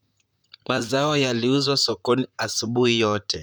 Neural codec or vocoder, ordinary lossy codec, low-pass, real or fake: vocoder, 44.1 kHz, 128 mel bands, Pupu-Vocoder; none; none; fake